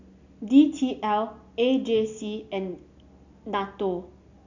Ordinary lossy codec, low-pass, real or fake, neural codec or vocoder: none; 7.2 kHz; real; none